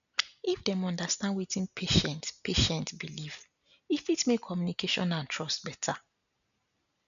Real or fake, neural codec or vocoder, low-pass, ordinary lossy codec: real; none; 7.2 kHz; none